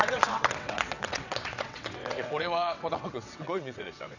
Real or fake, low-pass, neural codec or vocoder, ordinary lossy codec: fake; 7.2 kHz; vocoder, 22.05 kHz, 80 mel bands, WaveNeXt; none